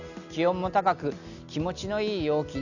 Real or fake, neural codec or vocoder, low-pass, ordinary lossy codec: real; none; 7.2 kHz; none